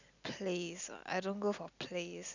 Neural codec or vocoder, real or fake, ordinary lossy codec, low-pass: none; real; none; 7.2 kHz